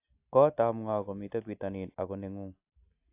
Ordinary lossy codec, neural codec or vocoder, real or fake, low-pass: AAC, 32 kbps; none; real; 3.6 kHz